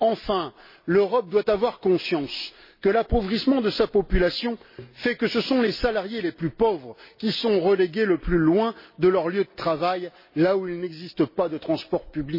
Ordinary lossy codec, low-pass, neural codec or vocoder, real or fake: MP3, 24 kbps; 5.4 kHz; none; real